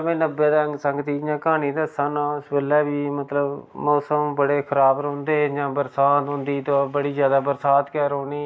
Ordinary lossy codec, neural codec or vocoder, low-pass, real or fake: none; none; none; real